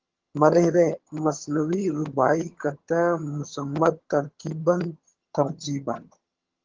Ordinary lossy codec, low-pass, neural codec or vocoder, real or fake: Opus, 16 kbps; 7.2 kHz; vocoder, 22.05 kHz, 80 mel bands, HiFi-GAN; fake